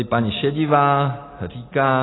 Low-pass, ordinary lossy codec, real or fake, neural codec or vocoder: 7.2 kHz; AAC, 16 kbps; real; none